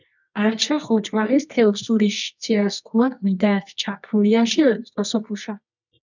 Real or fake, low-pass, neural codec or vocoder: fake; 7.2 kHz; codec, 24 kHz, 0.9 kbps, WavTokenizer, medium music audio release